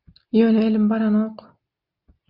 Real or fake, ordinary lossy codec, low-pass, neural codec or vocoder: real; Opus, 64 kbps; 5.4 kHz; none